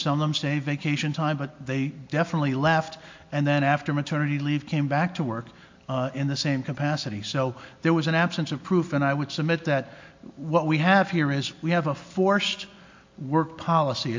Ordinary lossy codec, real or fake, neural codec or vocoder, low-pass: MP3, 48 kbps; real; none; 7.2 kHz